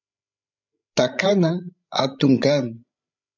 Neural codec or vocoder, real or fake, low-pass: codec, 16 kHz, 16 kbps, FreqCodec, larger model; fake; 7.2 kHz